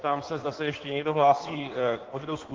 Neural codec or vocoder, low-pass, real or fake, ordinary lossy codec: codec, 16 kHz in and 24 kHz out, 1.1 kbps, FireRedTTS-2 codec; 7.2 kHz; fake; Opus, 16 kbps